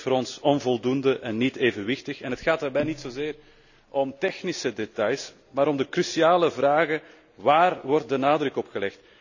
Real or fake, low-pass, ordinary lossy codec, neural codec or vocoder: real; 7.2 kHz; none; none